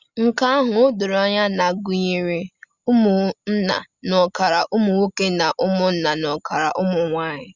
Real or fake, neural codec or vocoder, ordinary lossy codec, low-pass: real; none; Opus, 64 kbps; 7.2 kHz